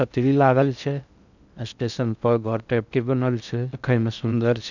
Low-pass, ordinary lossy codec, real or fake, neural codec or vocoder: 7.2 kHz; none; fake; codec, 16 kHz in and 24 kHz out, 0.6 kbps, FocalCodec, streaming, 2048 codes